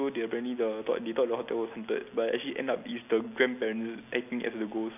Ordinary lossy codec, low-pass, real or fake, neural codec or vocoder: none; 3.6 kHz; real; none